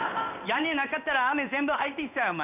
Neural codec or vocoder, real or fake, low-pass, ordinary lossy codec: codec, 16 kHz in and 24 kHz out, 1 kbps, XY-Tokenizer; fake; 3.6 kHz; none